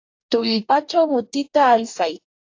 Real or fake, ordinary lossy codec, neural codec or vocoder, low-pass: fake; AAC, 48 kbps; codec, 44.1 kHz, 2.6 kbps, DAC; 7.2 kHz